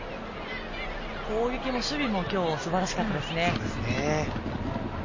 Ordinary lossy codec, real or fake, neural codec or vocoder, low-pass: MP3, 32 kbps; real; none; 7.2 kHz